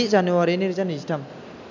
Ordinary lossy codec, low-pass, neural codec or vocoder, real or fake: none; 7.2 kHz; none; real